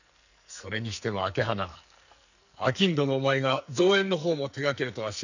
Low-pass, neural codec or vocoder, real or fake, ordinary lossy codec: 7.2 kHz; codec, 44.1 kHz, 2.6 kbps, SNAC; fake; none